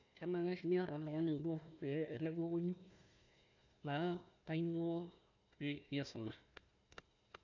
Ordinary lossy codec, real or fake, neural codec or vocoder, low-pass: none; fake; codec, 16 kHz, 1 kbps, FunCodec, trained on Chinese and English, 50 frames a second; 7.2 kHz